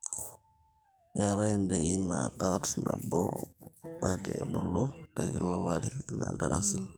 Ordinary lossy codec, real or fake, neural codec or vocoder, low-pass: none; fake; codec, 44.1 kHz, 2.6 kbps, SNAC; none